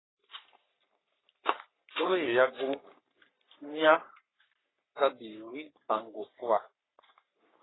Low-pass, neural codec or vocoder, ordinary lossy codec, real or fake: 7.2 kHz; codec, 44.1 kHz, 3.4 kbps, Pupu-Codec; AAC, 16 kbps; fake